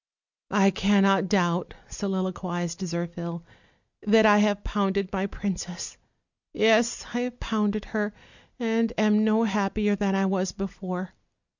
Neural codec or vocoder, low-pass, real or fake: none; 7.2 kHz; real